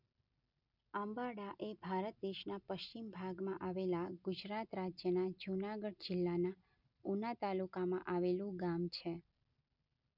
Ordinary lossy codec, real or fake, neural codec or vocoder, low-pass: none; real; none; 5.4 kHz